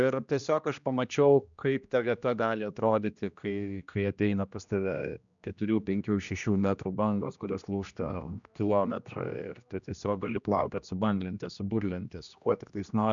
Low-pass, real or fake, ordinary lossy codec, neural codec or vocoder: 7.2 kHz; fake; MP3, 96 kbps; codec, 16 kHz, 1 kbps, X-Codec, HuBERT features, trained on general audio